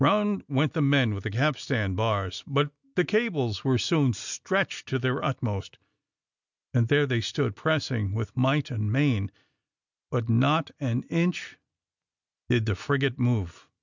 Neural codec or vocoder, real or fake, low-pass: none; real; 7.2 kHz